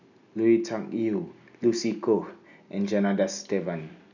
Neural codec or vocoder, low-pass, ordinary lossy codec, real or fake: none; 7.2 kHz; none; real